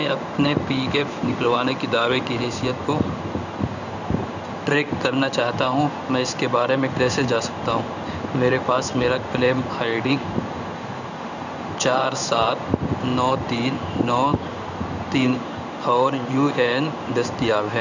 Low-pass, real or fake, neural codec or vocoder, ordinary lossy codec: 7.2 kHz; fake; codec, 16 kHz in and 24 kHz out, 1 kbps, XY-Tokenizer; none